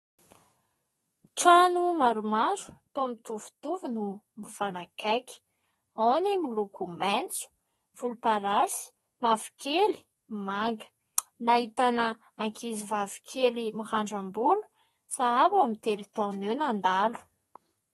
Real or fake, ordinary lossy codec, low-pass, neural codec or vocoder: fake; AAC, 32 kbps; 14.4 kHz; codec, 32 kHz, 1.9 kbps, SNAC